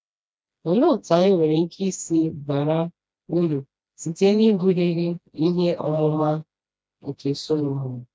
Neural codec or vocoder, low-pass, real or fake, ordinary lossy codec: codec, 16 kHz, 1 kbps, FreqCodec, smaller model; none; fake; none